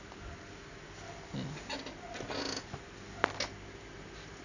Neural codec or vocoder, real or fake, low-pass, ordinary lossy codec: none; real; 7.2 kHz; none